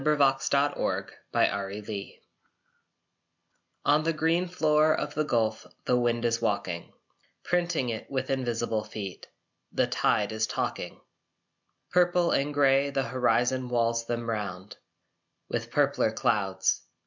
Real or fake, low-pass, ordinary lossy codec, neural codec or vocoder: real; 7.2 kHz; MP3, 64 kbps; none